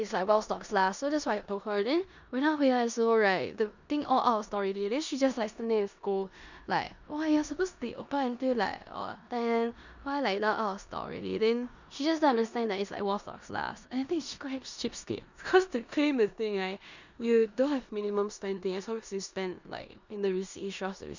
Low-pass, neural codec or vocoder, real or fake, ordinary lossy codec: 7.2 kHz; codec, 16 kHz in and 24 kHz out, 0.9 kbps, LongCat-Audio-Codec, four codebook decoder; fake; none